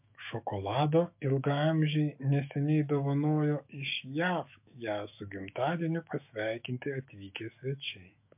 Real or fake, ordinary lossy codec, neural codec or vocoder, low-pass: fake; MP3, 32 kbps; autoencoder, 48 kHz, 128 numbers a frame, DAC-VAE, trained on Japanese speech; 3.6 kHz